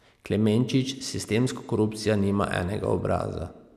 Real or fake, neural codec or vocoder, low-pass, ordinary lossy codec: real; none; 14.4 kHz; none